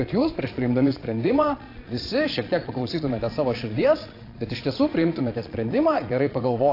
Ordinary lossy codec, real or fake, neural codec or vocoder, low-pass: AAC, 32 kbps; fake; vocoder, 22.05 kHz, 80 mel bands, Vocos; 5.4 kHz